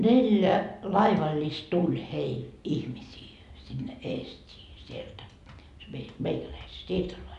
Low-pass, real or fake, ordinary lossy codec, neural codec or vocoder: 10.8 kHz; real; none; none